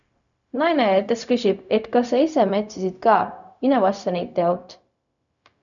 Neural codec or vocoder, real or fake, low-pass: codec, 16 kHz, 0.4 kbps, LongCat-Audio-Codec; fake; 7.2 kHz